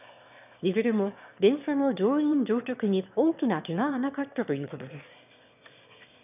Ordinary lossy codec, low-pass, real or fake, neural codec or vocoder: none; 3.6 kHz; fake; autoencoder, 22.05 kHz, a latent of 192 numbers a frame, VITS, trained on one speaker